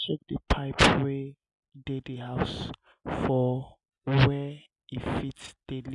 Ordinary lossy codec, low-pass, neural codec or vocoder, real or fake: none; 10.8 kHz; none; real